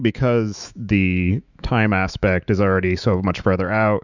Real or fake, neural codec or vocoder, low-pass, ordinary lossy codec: fake; autoencoder, 48 kHz, 128 numbers a frame, DAC-VAE, trained on Japanese speech; 7.2 kHz; Opus, 64 kbps